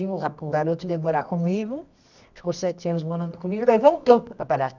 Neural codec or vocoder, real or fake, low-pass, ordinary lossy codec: codec, 24 kHz, 0.9 kbps, WavTokenizer, medium music audio release; fake; 7.2 kHz; none